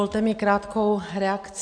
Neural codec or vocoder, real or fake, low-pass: none; real; 9.9 kHz